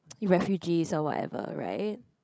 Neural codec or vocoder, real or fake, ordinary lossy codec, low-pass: codec, 16 kHz, 16 kbps, FreqCodec, larger model; fake; none; none